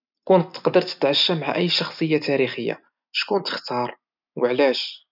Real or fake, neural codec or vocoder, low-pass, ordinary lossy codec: real; none; 5.4 kHz; none